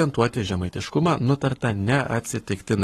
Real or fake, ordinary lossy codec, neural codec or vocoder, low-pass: fake; AAC, 32 kbps; codec, 44.1 kHz, 7.8 kbps, Pupu-Codec; 19.8 kHz